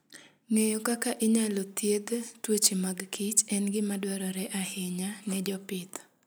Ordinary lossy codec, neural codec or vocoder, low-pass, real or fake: none; none; none; real